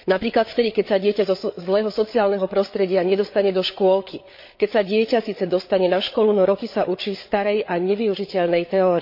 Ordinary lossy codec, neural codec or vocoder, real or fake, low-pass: MP3, 32 kbps; codec, 16 kHz, 8 kbps, FreqCodec, larger model; fake; 5.4 kHz